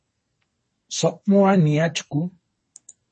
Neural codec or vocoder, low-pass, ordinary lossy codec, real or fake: codec, 44.1 kHz, 2.6 kbps, SNAC; 10.8 kHz; MP3, 32 kbps; fake